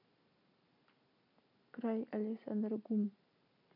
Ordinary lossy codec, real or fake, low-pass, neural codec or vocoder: none; real; 5.4 kHz; none